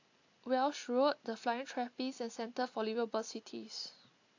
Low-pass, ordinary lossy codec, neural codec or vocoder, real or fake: 7.2 kHz; AAC, 48 kbps; none; real